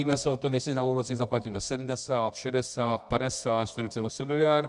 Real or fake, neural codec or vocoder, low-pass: fake; codec, 24 kHz, 0.9 kbps, WavTokenizer, medium music audio release; 10.8 kHz